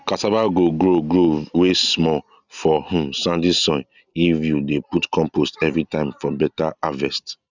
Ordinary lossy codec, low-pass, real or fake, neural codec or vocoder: none; 7.2 kHz; real; none